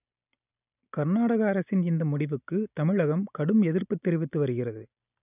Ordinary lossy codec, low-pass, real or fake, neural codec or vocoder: none; 3.6 kHz; real; none